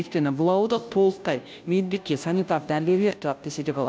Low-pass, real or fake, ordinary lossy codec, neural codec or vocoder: none; fake; none; codec, 16 kHz, 0.5 kbps, FunCodec, trained on Chinese and English, 25 frames a second